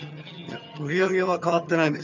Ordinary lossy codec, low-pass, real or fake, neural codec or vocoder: none; 7.2 kHz; fake; vocoder, 22.05 kHz, 80 mel bands, HiFi-GAN